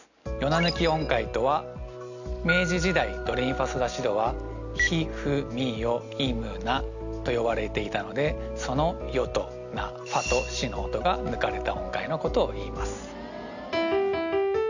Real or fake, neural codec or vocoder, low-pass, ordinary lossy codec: real; none; 7.2 kHz; none